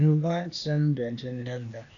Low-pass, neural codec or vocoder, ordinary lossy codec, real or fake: 7.2 kHz; codec, 16 kHz, 0.8 kbps, ZipCodec; MP3, 96 kbps; fake